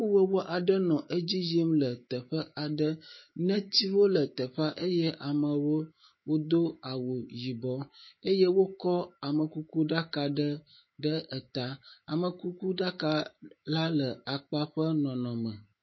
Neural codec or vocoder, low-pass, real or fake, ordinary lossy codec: autoencoder, 48 kHz, 128 numbers a frame, DAC-VAE, trained on Japanese speech; 7.2 kHz; fake; MP3, 24 kbps